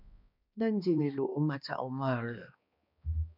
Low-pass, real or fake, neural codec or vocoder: 5.4 kHz; fake; codec, 16 kHz, 2 kbps, X-Codec, HuBERT features, trained on balanced general audio